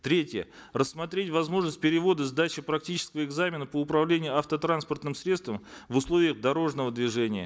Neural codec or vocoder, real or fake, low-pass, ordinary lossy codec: none; real; none; none